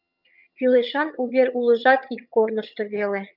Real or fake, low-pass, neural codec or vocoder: fake; 5.4 kHz; vocoder, 22.05 kHz, 80 mel bands, HiFi-GAN